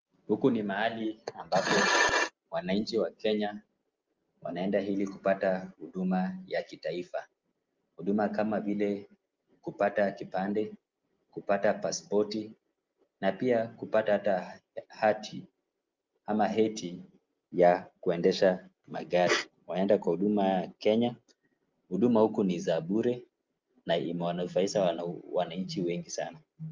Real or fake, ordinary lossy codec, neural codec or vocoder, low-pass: real; Opus, 24 kbps; none; 7.2 kHz